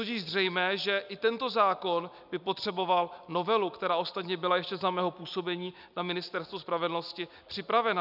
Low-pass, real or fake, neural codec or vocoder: 5.4 kHz; real; none